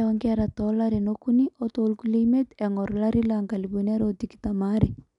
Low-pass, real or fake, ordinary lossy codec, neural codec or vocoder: 10.8 kHz; real; none; none